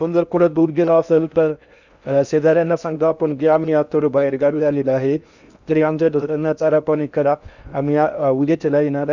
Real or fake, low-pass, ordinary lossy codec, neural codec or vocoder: fake; 7.2 kHz; none; codec, 16 kHz in and 24 kHz out, 0.6 kbps, FocalCodec, streaming, 4096 codes